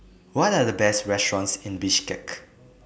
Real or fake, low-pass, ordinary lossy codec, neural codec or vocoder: real; none; none; none